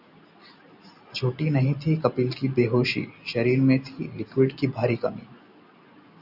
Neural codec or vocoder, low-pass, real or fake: none; 5.4 kHz; real